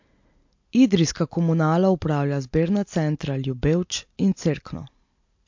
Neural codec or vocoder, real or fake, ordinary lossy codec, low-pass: none; real; MP3, 48 kbps; 7.2 kHz